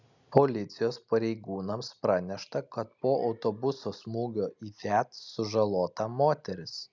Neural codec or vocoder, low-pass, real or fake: none; 7.2 kHz; real